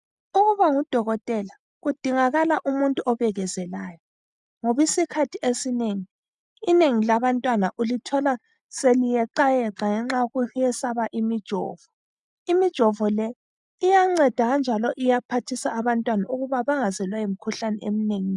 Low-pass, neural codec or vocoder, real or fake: 10.8 kHz; none; real